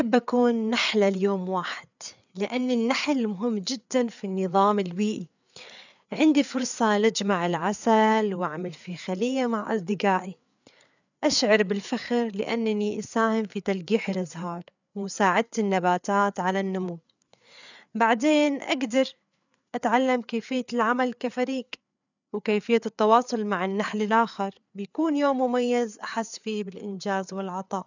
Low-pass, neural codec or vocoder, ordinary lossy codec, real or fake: 7.2 kHz; codec, 16 kHz, 4 kbps, FreqCodec, larger model; none; fake